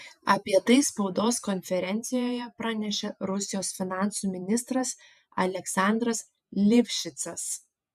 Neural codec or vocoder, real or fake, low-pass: vocoder, 48 kHz, 128 mel bands, Vocos; fake; 14.4 kHz